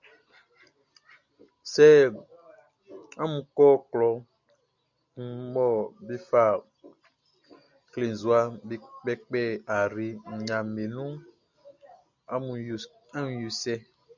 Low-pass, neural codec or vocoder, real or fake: 7.2 kHz; none; real